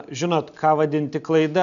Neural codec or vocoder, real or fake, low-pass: none; real; 7.2 kHz